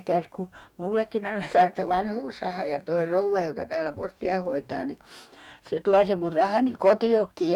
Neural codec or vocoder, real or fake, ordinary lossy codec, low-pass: codec, 44.1 kHz, 2.6 kbps, DAC; fake; none; 19.8 kHz